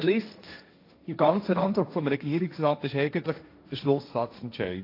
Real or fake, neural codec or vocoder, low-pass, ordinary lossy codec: fake; codec, 16 kHz, 1.1 kbps, Voila-Tokenizer; 5.4 kHz; AAC, 32 kbps